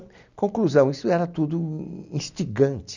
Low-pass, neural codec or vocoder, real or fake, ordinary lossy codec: 7.2 kHz; none; real; none